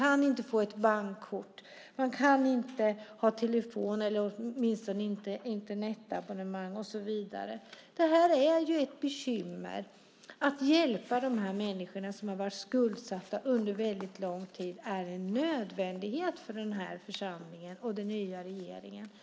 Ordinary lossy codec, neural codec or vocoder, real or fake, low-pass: none; none; real; none